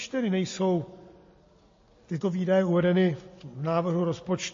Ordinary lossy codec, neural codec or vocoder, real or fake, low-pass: MP3, 32 kbps; none; real; 7.2 kHz